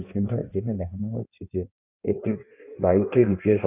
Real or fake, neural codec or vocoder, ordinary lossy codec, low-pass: fake; codec, 16 kHz in and 24 kHz out, 1.1 kbps, FireRedTTS-2 codec; none; 3.6 kHz